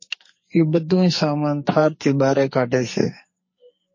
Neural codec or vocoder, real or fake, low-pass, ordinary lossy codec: codec, 44.1 kHz, 2.6 kbps, SNAC; fake; 7.2 kHz; MP3, 32 kbps